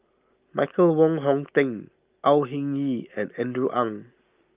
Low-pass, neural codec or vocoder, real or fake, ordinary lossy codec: 3.6 kHz; none; real; Opus, 24 kbps